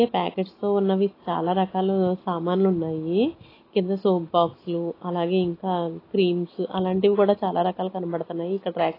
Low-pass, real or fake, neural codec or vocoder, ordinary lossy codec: 5.4 kHz; real; none; AAC, 24 kbps